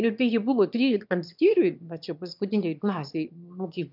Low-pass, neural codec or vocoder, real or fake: 5.4 kHz; autoencoder, 22.05 kHz, a latent of 192 numbers a frame, VITS, trained on one speaker; fake